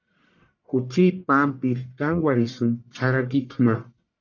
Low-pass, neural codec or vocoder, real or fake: 7.2 kHz; codec, 44.1 kHz, 1.7 kbps, Pupu-Codec; fake